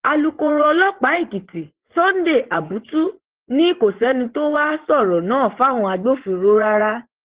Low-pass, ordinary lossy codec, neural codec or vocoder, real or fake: 3.6 kHz; Opus, 16 kbps; vocoder, 24 kHz, 100 mel bands, Vocos; fake